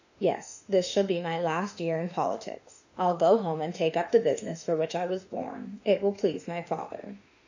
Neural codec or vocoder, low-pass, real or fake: autoencoder, 48 kHz, 32 numbers a frame, DAC-VAE, trained on Japanese speech; 7.2 kHz; fake